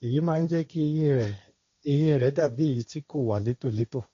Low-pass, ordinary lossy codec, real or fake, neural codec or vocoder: 7.2 kHz; MP3, 48 kbps; fake; codec, 16 kHz, 1.1 kbps, Voila-Tokenizer